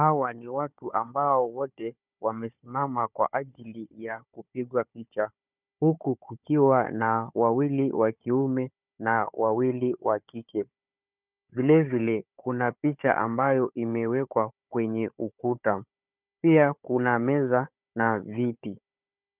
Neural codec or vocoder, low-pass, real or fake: codec, 16 kHz, 4 kbps, FunCodec, trained on Chinese and English, 50 frames a second; 3.6 kHz; fake